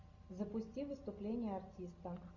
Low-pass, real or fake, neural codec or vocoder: 7.2 kHz; real; none